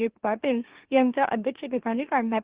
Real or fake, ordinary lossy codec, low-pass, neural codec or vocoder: fake; Opus, 16 kbps; 3.6 kHz; autoencoder, 44.1 kHz, a latent of 192 numbers a frame, MeloTTS